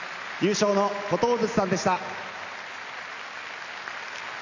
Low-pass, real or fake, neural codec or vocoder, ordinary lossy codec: 7.2 kHz; real; none; none